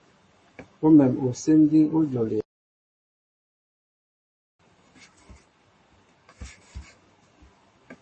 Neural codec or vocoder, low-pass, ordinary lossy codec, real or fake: codec, 44.1 kHz, 7.8 kbps, Pupu-Codec; 10.8 kHz; MP3, 32 kbps; fake